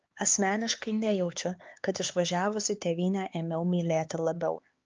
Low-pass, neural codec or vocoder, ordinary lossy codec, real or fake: 7.2 kHz; codec, 16 kHz, 4 kbps, X-Codec, HuBERT features, trained on LibriSpeech; Opus, 24 kbps; fake